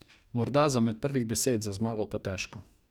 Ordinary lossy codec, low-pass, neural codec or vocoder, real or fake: none; 19.8 kHz; codec, 44.1 kHz, 2.6 kbps, DAC; fake